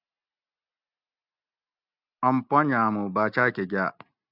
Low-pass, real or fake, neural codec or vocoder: 5.4 kHz; real; none